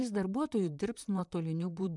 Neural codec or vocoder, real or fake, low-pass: vocoder, 44.1 kHz, 128 mel bands, Pupu-Vocoder; fake; 10.8 kHz